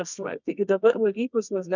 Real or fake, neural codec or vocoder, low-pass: fake; codec, 24 kHz, 0.9 kbps, WavTokenizer, medium music audio release; 7.2 kHz